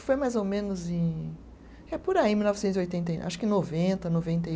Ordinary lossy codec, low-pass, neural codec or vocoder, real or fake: none; none; none; real